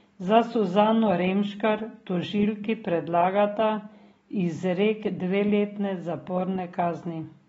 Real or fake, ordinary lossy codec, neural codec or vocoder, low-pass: real; AAC, 24 kbps; none; 14.4 kHz